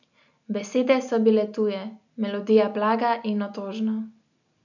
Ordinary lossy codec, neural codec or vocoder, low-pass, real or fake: none; none; 7.2 kHz; real